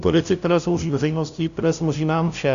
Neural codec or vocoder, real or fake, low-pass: codec, 16 kHz, 0.5 kbps, FunCodec, trained on LibriTTS, 25 frames a second; fake; 7.2 kHz